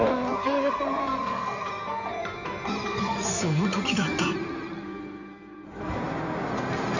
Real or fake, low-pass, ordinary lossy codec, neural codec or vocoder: fake; 7.2 kHz; none; codec, 16 kHz in and 24 kHz out, 2.2 kbps, FireRedTTS-2 codec